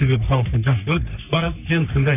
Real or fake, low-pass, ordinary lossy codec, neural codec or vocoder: fake; 3.6 kHz; none; codec, 44.1 kHz, 3.4 kbps, Pupu-Codec